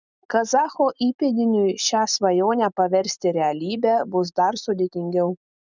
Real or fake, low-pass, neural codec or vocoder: real; 7.2 kHz; none